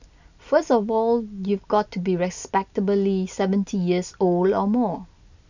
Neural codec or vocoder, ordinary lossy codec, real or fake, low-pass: none; none; real; 7.2 kHz